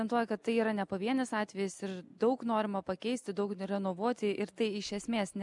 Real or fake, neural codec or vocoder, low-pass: real; none; 10.8 kHz